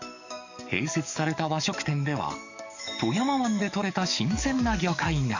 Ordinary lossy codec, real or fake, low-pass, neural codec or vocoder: none; fake; 7.2 kHz; codec, 44.1 kHz, 7.8 kbps, DAC